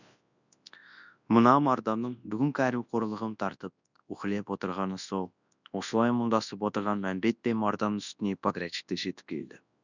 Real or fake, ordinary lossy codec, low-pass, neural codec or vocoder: fake; none; 7.2 kHz; codec, 24 kHz, 0.9 kbps, WavTokenizer, large speech release